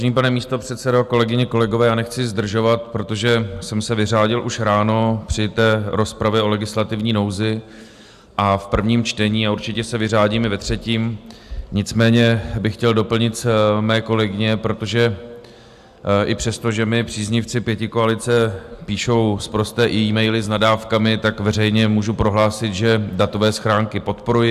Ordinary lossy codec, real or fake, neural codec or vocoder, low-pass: AAC, 96 kbps; real; none; 14.4 kHz